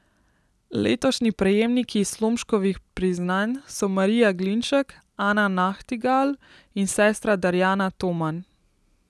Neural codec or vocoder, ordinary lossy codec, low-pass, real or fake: none; none; none; real